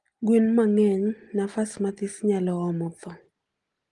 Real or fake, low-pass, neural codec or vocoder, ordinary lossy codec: real; 10.8 kHz; none; Opus, 24 kbps